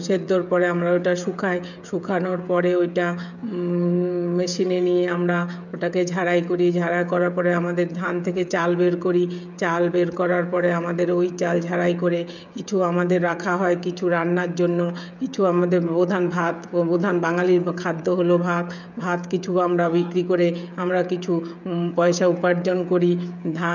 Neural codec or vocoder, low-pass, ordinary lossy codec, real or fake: codec, 16 kHz, 16 kbps, FreqCodec, smaller model; 7.2 kHz; none; fake